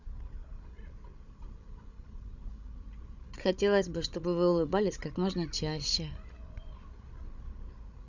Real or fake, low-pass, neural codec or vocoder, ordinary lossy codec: fake; 7.2 kHz; codec, 16 kHz, 16 kbps, FreqCodec, larger model; none